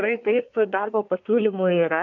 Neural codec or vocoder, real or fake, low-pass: codec, 24 kHz, 1 kbps, SNAC; fake; 7.2 kHz